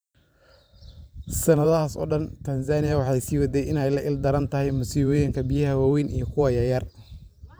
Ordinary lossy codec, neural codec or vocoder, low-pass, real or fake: none; vocoder, 44.1 kHz, 128 mel bands every 512 samples, BigVGAN v2; none; fake